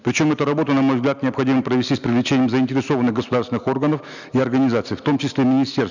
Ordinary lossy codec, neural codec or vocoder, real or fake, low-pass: none; none; real; 7.2 kHz